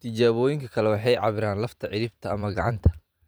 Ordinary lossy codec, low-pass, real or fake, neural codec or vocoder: none; none; fake; vocoder, 44.1 kHz, 128 mel bands every 512 samples, BigVGAN v2